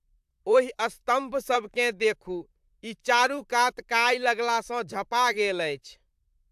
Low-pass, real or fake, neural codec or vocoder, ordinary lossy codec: 14.4 kHz; fake; vocoder, 44.1 kHz, 128 mel bands, Pupu-Vocoder; AAC, 96 kbps